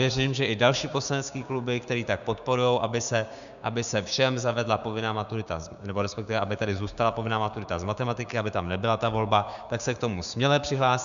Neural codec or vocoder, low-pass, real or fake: codec, 16 kHz, 6 kbps, DAC; 7.2 kHz; fake